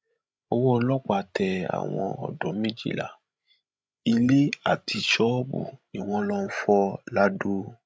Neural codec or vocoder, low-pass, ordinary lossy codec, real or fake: codec, 16 kHz, 16 kbps, FreqCodec, larger model; none; none; fake